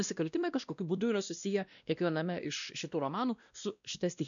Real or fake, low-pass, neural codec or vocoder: fake; 7.2 kHz; codec, 16 kHz, 1 kbps, X-Codec, WavLM features, trained on Multilingual LibriSpeech